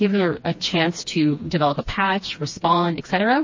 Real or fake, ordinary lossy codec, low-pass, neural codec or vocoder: fake; MP3, 32 kbps; 7.2 kHz; codec, 16 kHz, 2 kbps, FreqCodec, smaller model